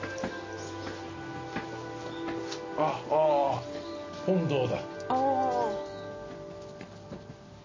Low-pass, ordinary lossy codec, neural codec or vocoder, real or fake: 7.2 kHz; MP3, 32 kbps; none; real